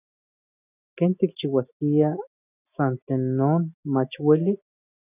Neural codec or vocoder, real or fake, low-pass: none; real; 3.6 kHz